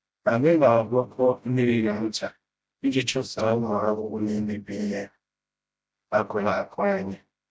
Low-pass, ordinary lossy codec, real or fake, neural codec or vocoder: none; none; fake; codec, 16 kHz, 0.5 kbps, FreqCodec, smaller model